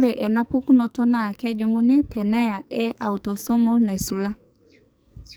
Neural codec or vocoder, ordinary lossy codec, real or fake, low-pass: codec, 44.1 kHz, 2.6 kbps, SNAC; none; fake; none